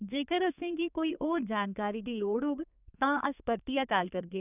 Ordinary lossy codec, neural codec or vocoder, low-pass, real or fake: none; codec, 32 kHz, 1.9 kbps, SNAC; 3.6 kHz; fake